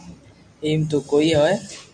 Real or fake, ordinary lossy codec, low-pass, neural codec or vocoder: fake; AAC, 64 kbps; 9.9 kHz; vocoder, 44.1 kHz, 128 mel bands every 256 samples, BigVGAN v2